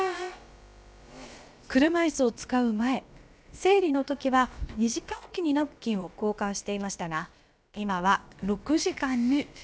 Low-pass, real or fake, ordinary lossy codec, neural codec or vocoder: none; fake; none; codec, 16 kHz, about 1 kbps, DyCAST, with the encoder's durations